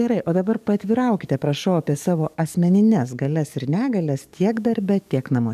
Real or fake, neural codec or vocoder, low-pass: fake; codec, 44.1 kHz, 7.8 kbps, DAC; 14.4 kHz